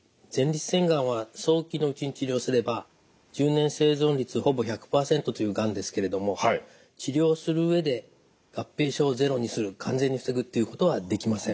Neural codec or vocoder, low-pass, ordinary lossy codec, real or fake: none; none; none; real